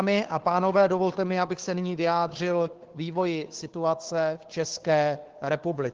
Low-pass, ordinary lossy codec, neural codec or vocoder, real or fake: 7.2 kHz; Opus, 16 kbps; codec, 16 kHz, 2 kbps, FunCodec, trained on Chinese and English, 25 frames a second; fake